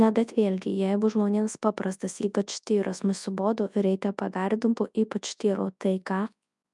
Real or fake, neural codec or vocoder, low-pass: fake; codec, 24 kHz, 0.9 kbps, WavTokenizer, large speech release; 10.8 kHz